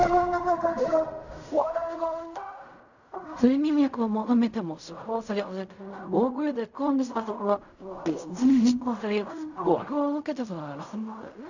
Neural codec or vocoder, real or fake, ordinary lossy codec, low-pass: codec, 16 kHz in and 24 kHz out, 0.4 kbps, LongCat-Audio-Codec, fine tuned four codebook decoder; fake; none; 7.2 kHz